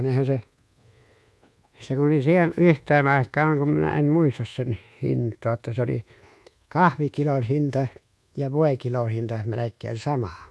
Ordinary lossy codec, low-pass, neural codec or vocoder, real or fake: none; none; codec, 24 kHz, 1.2 kbps, DualCodec; fake